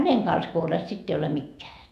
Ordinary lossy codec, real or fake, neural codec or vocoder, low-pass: MP3, 96 kbps; fake; autoencoder, 48 kHz, 128 numbers a frame, DAC-VAE, trained on Japanese speech; 14.4 kHz